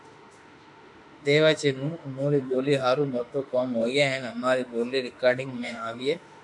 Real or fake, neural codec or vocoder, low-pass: fake; autoencoder, 48 kHz, 32 numbers a frame, DAC-VAE, trained on Japanese speech; 10.8 kHz